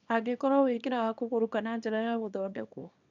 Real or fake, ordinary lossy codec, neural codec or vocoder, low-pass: fake; none; codec, 24 kHz, 0.9 kbps, WavTokenizer, small release; 7.2 kHz